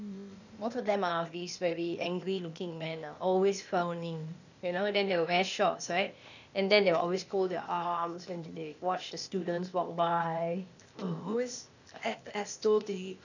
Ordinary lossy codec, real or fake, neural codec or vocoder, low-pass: none; fake; codec, 16 kHz, 0.8 kbps, ZipCodec; 7.2 kHz